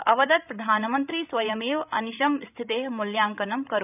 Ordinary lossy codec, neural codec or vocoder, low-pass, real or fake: none; codec, 16 kHz, 16 kbps, FreqCodec, larger model; 3.6 kHz; fake